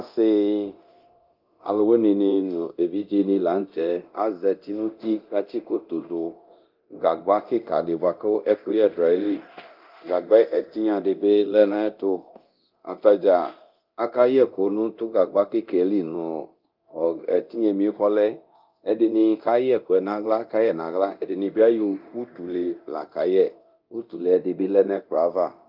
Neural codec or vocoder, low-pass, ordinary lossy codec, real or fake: codec, 24 kHz, 0.9 kbps, DualCodec; 5.4 kHz; Opus, 24 kbps; fake